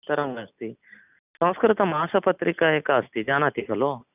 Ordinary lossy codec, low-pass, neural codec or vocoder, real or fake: none; 3.6 kHz; none; real